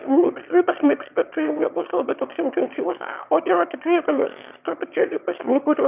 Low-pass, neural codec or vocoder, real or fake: 3.6 kHz; autoencoder, 22.05 kHz, a latent of 192 numbers a frame, VITS, trained on one speaker; fake